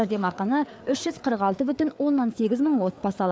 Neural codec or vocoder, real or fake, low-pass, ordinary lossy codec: codec, 16 kHz, 4 kbps, FreqCodec, larger model; fake; none; none